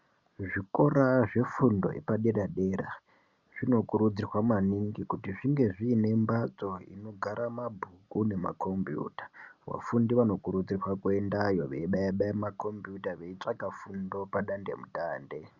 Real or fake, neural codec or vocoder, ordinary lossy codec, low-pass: real; none; Opus, 64 kbps; 7.2 kHz